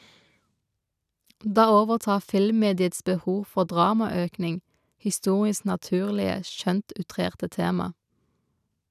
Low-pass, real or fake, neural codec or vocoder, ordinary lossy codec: 14.4 kHz; real; none; none